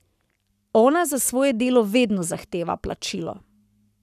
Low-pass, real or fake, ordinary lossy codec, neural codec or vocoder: 14.4 kHz; fake; none; codec, 44.1 kHz, 7.8 kbps, Pupu-Codec